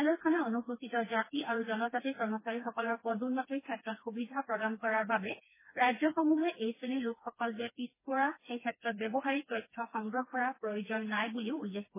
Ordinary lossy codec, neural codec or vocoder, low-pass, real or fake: MP3, 16 kbps; codec, 16 kHz, 2 kbps, FreqCodec, smaller model; 3.6 kHz; fake